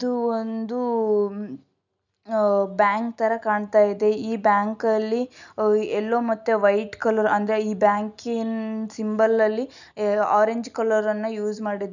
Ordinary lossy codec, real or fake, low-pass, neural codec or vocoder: none; real; 7.2 kHz; none